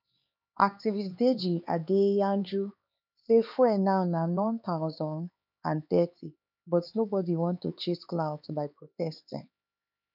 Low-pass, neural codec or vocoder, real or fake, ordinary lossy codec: 5.4 kHz; codec, 16 kHz, 4 kbps, X-Codec, HuBERT features, trained on LibriSpeech; fake; none